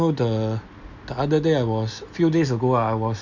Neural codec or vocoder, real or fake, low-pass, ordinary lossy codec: none; real; 7.2 kHz; none